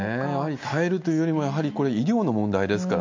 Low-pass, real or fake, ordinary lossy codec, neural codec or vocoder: 7.2 kHz; real; MP3, 48 kbps; none